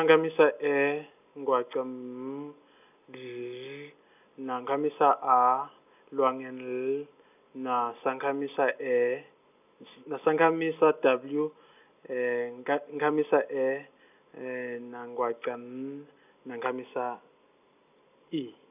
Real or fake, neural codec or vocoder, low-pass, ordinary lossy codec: real; none; 3.6 kHz; none